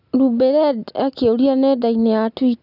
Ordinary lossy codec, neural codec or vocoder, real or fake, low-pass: none; none; real; 5.4 kHz